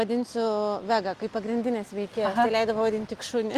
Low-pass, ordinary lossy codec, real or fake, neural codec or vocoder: 14.4 kHz; Opus, 32 kbps; real; none